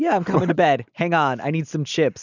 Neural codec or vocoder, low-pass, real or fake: none; 7.2 kHz; real